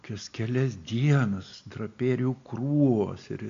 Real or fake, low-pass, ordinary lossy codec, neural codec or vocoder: real; 7.2 kHz; AAC, 48 kbps; none